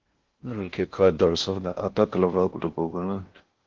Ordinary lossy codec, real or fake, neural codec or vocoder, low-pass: Opus, 16 kbps; fake; codec, 16 kHz in and 24 kHz out, 0.6 kbps, FocalCodec, streaming, 4096 codes; 7.2 kHz